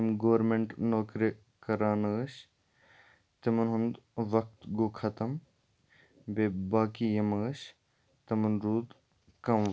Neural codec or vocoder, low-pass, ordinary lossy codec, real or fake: none; none; none; real